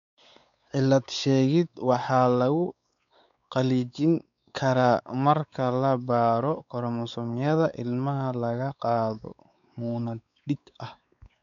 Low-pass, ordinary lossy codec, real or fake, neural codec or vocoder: 7.2 kHz; none; fake; codec, 16 kHz, 4 kbps, X-Codec, WavLM features, trained on Multilingual LibriSpeech